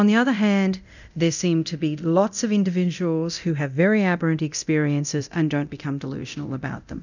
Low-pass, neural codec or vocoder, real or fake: 7.2 kHz; codec, 24 kHz, 0.9 kbps, DualCodec; fake